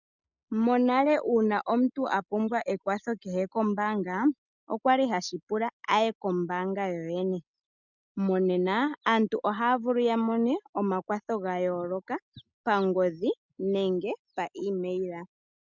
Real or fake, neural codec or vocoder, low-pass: real; none; 7.2 kHz